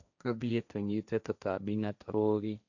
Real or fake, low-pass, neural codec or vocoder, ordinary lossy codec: fake; 7.2 kHz; codec, 16 kHz, 1.1 kbps, Voila-Tokenizer; none